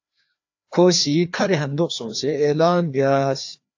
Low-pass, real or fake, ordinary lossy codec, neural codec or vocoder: 7.2 kHz; fake; AAC, 48 kbps; codec, 16 kHz, 2 kbps, FreqCodec, larger model